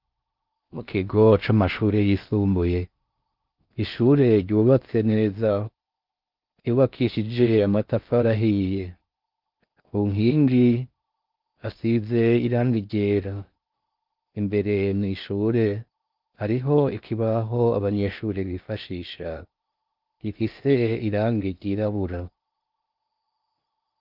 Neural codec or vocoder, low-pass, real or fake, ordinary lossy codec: codec, 16 kHz in and 24 kHz out, 0.6 kbps, FocalCodec, streaming, 4096 codes; 5.4 kHz; fake; Opus, 24 kbps